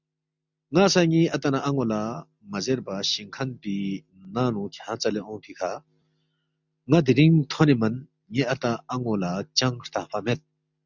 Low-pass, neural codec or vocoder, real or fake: 7.2 kHz; none; real